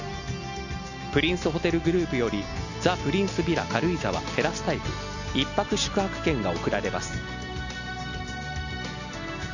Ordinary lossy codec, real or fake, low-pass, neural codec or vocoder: none; real; 7.2 kHz; none